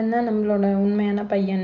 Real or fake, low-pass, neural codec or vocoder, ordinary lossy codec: real; 7.2 kHz; none; none